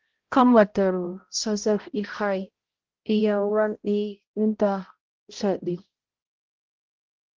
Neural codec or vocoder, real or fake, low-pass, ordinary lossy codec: codec, 16 kHz, 0.5 kbps, X-Codec, HuBERT features, trained on balanced general audio; fake; 7.2 kHz; Opus, 16 kbps